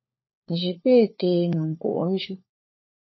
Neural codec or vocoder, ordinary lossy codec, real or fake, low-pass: codec, 16 kHz, 4 kbps, FunCodec, trained on LibriTTS, 50 frames a second; MP3, 24 kbps; fake; 7.2 kHz